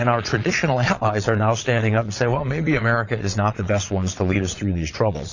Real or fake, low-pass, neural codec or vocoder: fake; 7.2 kHz; vocoder, 22.05 kHz, 80 mel bands, Vocos